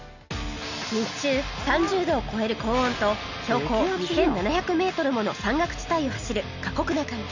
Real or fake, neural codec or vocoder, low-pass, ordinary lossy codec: real; none; 7.2 kHz; none